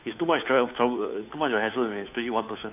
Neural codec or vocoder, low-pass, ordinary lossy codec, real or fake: codec, 16 kHz, 2 kbps, FunCodec, trained on Chinese and English, 25 frames a second; 3.6 kHz; none; fake